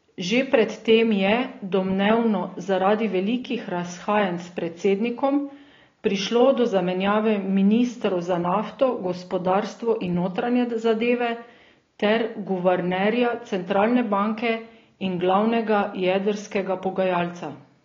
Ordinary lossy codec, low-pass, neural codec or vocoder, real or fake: AAC, 32 kbps; 7.2 kHz; none; real